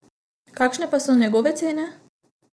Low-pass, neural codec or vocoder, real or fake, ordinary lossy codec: none; vocoder, 22.05 kHz, 80 mel bands, WaveNeXt; fake; none